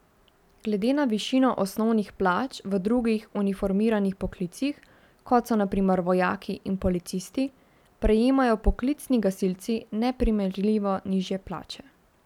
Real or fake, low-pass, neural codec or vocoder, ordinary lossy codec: real; 19.8 kHz; none; none